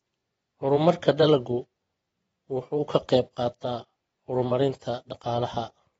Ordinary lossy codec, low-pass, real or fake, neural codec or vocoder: AAC, 24 kbps; 10.8 kHz; fake; vocoder, 24 kHz, 100 mel bands, Vocos